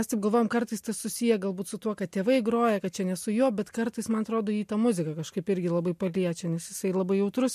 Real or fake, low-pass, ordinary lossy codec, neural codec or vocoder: real; 14.4 kHz; MP3, 64 kbps; none